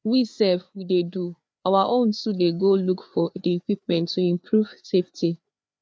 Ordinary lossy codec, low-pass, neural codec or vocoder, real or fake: none; none; codec, 16 kHz, 4 kbps, FreqCodec, larger model; fake